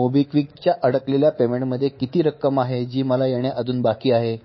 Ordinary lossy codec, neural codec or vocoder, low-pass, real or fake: MP3, 24 kbps; codec, 24 kHz, 3.1 kbps, DualCodec; 7.2 kHz; fake